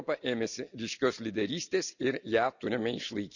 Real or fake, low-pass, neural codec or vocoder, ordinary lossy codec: real; 7.2 kHz; none; none